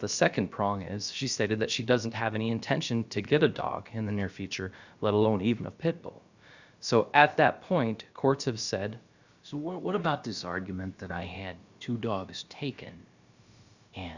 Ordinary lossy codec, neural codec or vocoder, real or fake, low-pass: Opus, 64 kbps; codec, 16 kHz, about 1 kbps, DyCAST, with the encoder's durations; fake; 7.2 kHz